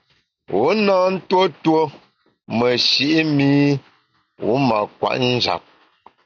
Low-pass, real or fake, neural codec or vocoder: 7.2 kHz; real; none